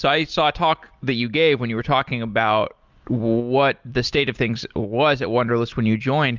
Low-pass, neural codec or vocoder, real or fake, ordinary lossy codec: 7.2 kHz; none; real; Opus, 24 kbps